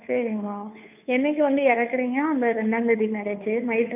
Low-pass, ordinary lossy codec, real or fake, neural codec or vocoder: 3.6 kHz; none; fake; codec, 16 kHz, 2 kbps, FunCodec, trained on Chinese and English, 25 frames a second